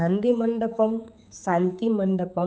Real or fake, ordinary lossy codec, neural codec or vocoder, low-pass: fake; none; codec, 16 kHz, 4 kbps, X-Codec, HuBERT features, trained on general audio; none